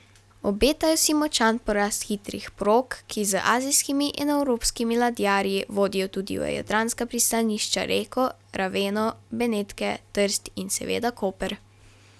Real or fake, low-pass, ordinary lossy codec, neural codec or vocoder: real; none; none; none